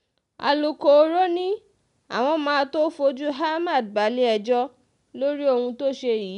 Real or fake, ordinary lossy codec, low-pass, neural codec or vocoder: real; none; 10.8 kHz; none